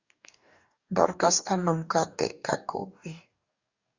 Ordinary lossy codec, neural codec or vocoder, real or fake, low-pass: Opus, 64 kbps; codec, 44.1 kHz, 2.6 kbps, DAC; fake; 7.2 kHz